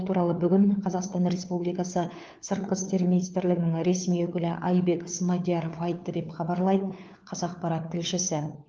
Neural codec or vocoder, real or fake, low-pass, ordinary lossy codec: codec, 16 kHz, 4 kbps, FunCodec, trained on LibriTTS, 50 frames a second; fake; 7.2 kHz; Opus, 32 kbps